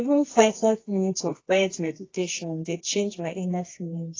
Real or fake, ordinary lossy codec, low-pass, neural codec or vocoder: fake; AAC, 32 kbps; 7.2 kHz; codec, 24 kHz, 0.9 kbps, WavTokenizer, medium music audio release